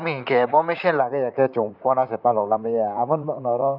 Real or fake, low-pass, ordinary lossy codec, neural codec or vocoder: fake; 5.4 kHz; none; vocoder, 44.1 kHz, 128 mel bands, Pupu-Vocoder